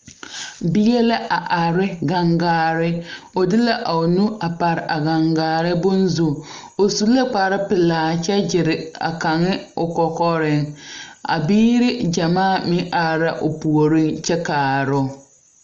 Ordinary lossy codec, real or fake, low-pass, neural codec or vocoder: Opus, 32 kbps; real; 7.2 kHz; none